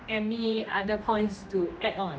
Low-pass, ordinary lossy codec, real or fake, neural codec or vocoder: none; none; fake; codec, 16 kHz, 1 kbps, X-Codec, HuBERT features, trained on general audio